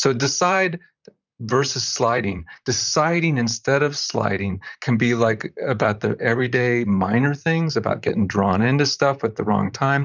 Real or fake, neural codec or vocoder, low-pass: fake; vocoder, 44.1 kHz, 128 mel bands, Pupu-Vocoder; 7.2 kHz